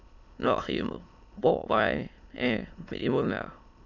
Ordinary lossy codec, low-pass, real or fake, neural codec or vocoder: none; 7.2 kHz; fake; autoencoder, 22.05 kHz, a latent of 192 numbers a frame, VITS, trained on many speakers